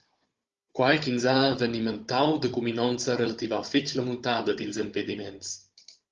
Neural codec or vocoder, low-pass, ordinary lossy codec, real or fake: codec, 16 kHz, 16 kbps, FunCodec, trained on Chinese and English, 50 frames a second; 7.2 kHz; Opus, 32 kbps; fake